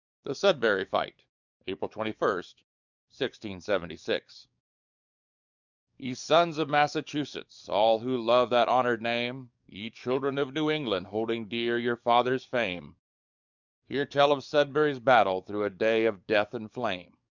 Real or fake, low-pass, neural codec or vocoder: fake; 7.2 kHz; codec, 44.1 kHz, 7.8 kbps, DAC